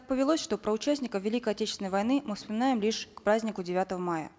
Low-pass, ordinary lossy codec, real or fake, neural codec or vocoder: none; none; real; none